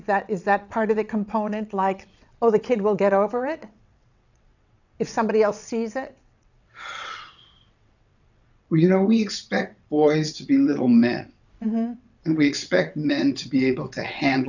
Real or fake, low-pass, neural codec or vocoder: fake; 7.2 kHz; vocoder, 22.05 kHz, 80 mel bands, WaveNeXt